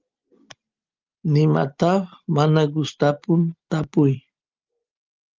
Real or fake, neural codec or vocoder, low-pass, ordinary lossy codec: real; none; 7.2 kHz; Opus, 24 kbps